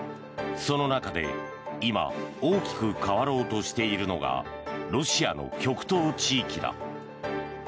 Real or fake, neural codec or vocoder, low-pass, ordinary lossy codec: real; none; none; none